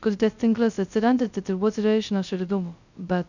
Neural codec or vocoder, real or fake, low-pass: codec, 16 kHz, 0.2 kbps, FocalCodec; fake; 7.2 kHz